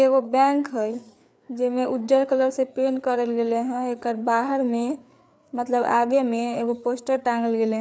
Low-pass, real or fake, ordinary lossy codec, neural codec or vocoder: none; fake; none; codec, 16 kHz, 4 kbps, FreqCodec, larger model